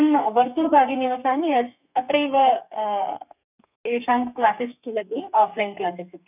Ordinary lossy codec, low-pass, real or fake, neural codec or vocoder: none; 3.6 kHz; fake; codec, 32 kHz, 1.9 kbps, SNAC